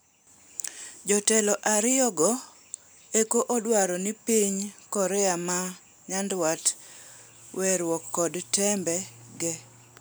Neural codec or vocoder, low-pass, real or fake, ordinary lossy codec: none; none; real; none